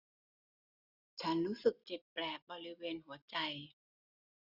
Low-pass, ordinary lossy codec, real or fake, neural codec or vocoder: 5.4 kHz; none; real; none